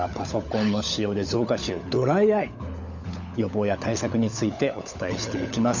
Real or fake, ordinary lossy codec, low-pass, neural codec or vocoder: fake; none; 7.2 kHz; codec, 16 kHz, 16 kbps, FunCodec, trained on LibriTTS, 50 frames a second